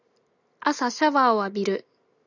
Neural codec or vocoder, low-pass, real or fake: none; 7.2 kHz; real